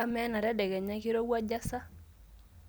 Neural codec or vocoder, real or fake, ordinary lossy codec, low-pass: none; real; none; none